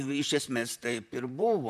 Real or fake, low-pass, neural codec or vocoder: fake; 14.4 kHz; vocoder, 44.1 kHz, 128 mel bands, Pupu-Vocoder